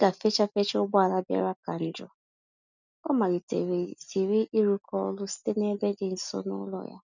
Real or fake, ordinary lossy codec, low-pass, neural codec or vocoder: real; none; 7.2 kHz; none